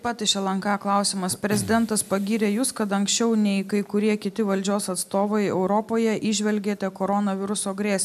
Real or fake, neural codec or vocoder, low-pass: real; none; 14.4 kHz